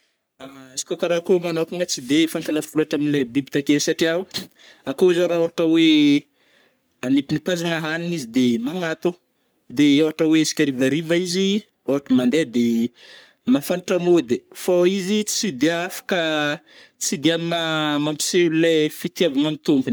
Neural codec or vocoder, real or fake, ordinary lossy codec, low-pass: codec, 44.1 kHz, 3.4 kbps, Pupu-Codec; fake; none; none